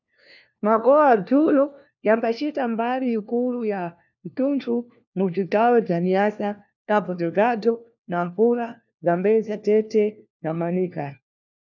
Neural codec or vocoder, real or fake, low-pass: codec, 16 kHz, 1 kbps, FunCodec, trained on LibriTTS, 50 frames a second; fake; 7.2 kHz